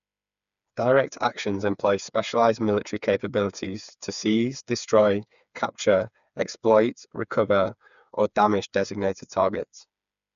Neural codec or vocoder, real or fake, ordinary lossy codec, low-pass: codec, 16 kHz, 4 kbps, FreqCodec, smaller model; fake; none; 7.2 kHz